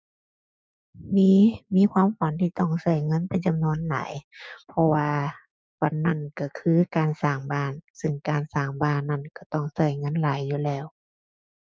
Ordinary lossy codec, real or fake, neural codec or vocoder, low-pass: none; fake; codec, 16 kHz, 6 kbps, DAC; none